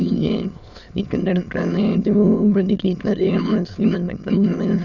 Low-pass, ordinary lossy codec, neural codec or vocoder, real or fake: 7.2 kHz; none; autoencoder, 22.05 kHz, a latent of 192 numbers a frame, VITS, trained on many speakers; fake